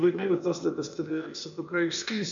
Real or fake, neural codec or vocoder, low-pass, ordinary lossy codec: fake; codec, 16 kHz, 0.8 kbps, ZipCodec; 7.2 kHz; AAC, 64 kbps